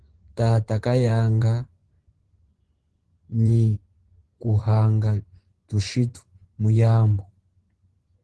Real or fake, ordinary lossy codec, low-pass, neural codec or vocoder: fake; Opus, 16 kbps; 10.8 kHz; vocoder, 24 kHz, 100 mel bands, Vocos